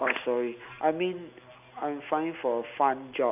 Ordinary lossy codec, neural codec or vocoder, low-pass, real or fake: none; none; 3.6 kHz; real